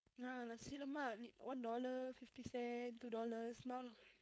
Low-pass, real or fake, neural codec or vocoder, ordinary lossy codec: none; fake; codec, 16 kHz, 4.8 kbps, FACodec; none